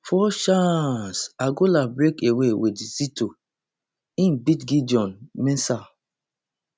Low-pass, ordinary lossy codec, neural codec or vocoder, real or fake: none; none; none; real